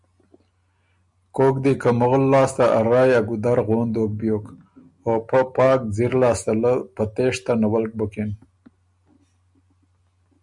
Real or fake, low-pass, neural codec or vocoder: real; 10.8 kHz; none